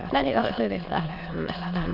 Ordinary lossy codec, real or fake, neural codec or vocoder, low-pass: MP3, 48 kbps; fake; autoencoder, 22.05 kHz, a latent of 192 numbers a frame, VITS, trained on many speakers; 5.4 kHz